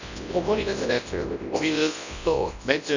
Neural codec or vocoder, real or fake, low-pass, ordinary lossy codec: codec, 24 kHz, 0.9 kbps, WavTokenizer, large speech release; fake; 7.2 kHz; none